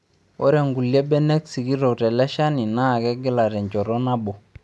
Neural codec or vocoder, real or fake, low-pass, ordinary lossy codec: none; real; none; none